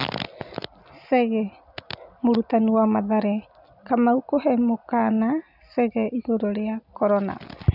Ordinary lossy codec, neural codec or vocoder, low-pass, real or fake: none; none; 5.4 kHz; real